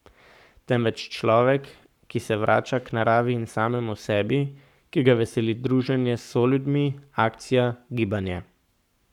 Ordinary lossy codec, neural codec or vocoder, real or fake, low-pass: none; codec, 44.1 kHz, 7.8 kbps, Pupu-Codec; fake; 19.8 kHz